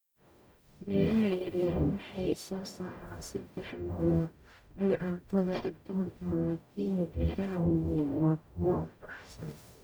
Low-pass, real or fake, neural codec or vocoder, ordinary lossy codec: none; fake; codec, 44.1 kHz, 0.9 kbps, DAC; none